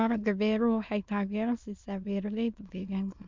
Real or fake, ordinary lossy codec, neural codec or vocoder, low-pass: fake; MP3, 64 kbps; autoencoder, 22.05 kHz, a latent of 192 numbers a frame, VITS, trained on many speakers; 7.2 kHz